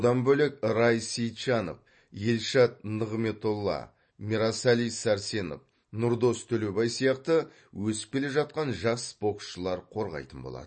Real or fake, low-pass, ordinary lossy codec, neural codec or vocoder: real; 9.9 kHz; MP3, 32 kbps; none